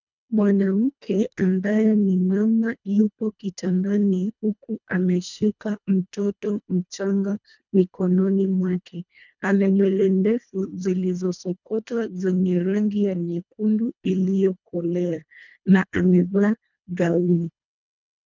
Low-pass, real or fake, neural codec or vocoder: 7.2 kHz; fake; codec, 24 kHz, 1.5 kbps, HILCodec